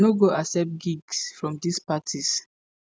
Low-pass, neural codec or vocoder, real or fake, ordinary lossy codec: none; none; real; none